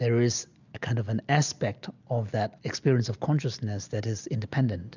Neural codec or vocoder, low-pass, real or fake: none; 7.2 kHz; real